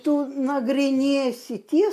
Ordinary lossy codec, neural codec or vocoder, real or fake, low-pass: AAC, 96 kbps; none; real; 14.4 kHz